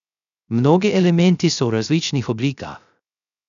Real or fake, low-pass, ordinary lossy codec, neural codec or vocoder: fake; 7.2 kHz; none; codec, 16 kHz, 0.3 kbps, FocalCodec